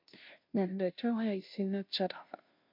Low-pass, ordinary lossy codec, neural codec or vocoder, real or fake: 5.4 kHz; MP3, 48 kbps; codec, 16 kHz, 0.5 kbps, FunCodec, trained on Chinese and English, 25 frames a second; fake